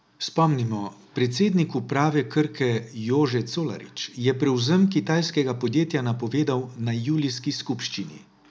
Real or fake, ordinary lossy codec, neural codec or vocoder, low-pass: real; none; none; none